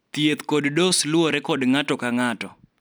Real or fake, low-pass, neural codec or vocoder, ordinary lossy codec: real; none; none; none